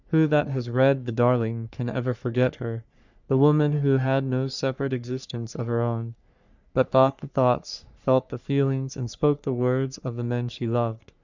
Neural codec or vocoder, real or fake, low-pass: codec, 44.1 kHz, 3.4 kbps, Pupu-Codec; fake; 7.2 kHz